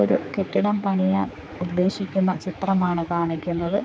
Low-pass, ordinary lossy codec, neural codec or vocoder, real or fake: none; none; codec, 16 kHz, 4 kbps, X-Codec, HuBERT features, trained on general audio; fake